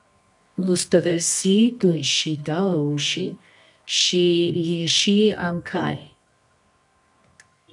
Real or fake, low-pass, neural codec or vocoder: fake; 10.8 kHz; codec, 24 kHz, 0.9 kbps, WavTokenizer, medium music audio release